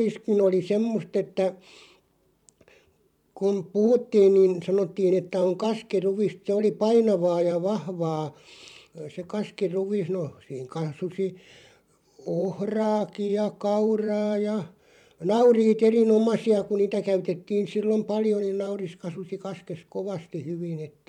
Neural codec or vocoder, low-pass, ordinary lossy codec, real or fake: vocoder, 44.1 kHz, 128 mel bands every 512 samples, BigVGAN v2; 19.8 kHz; none; fake